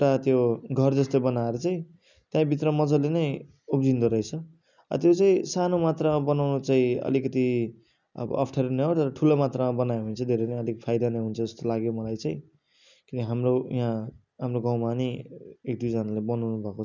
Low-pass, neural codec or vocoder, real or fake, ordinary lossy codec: 7.2 kHz; none; real; none